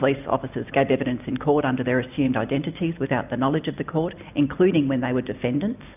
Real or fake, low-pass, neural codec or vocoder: real; 3.6 kHz; none